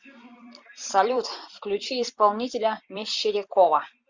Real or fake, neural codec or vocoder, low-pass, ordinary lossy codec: fake; vocoder, 44.1 kHz, 128 mel bands every 256 samples, BigVGAN v2; 7.2 kHz; Opus, 64 kbps